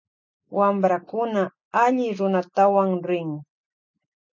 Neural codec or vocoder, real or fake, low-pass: none; real; 7.2 kHz